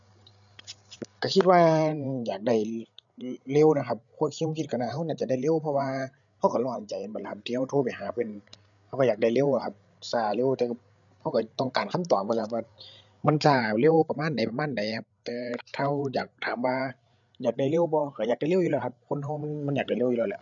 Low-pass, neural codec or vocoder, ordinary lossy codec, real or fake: 7.2 kHz; codec, 16 kHz, 8 kbps, FreqCodec, larger model; none; fake